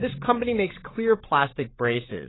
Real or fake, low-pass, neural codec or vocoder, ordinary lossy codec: fake; 7.2 kHz; codec, 16 kHz, 8 kbps, FreqCodec, larger model; AAC, 16 kbps